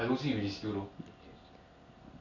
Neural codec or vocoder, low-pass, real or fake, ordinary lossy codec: none; 7.2 kHz; real; none